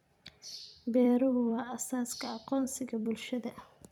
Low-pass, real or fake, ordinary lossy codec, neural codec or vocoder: 19.8 kHz; fake; none; vocoder, 44.1 kHz, 128 mel bands every 256 samples, BigVGAN v2